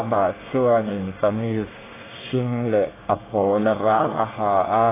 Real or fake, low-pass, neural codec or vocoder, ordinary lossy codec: fake; 3.6 kHz; codec, 24 kHz, 1 kbps, SNAC; AAC, 16 kbps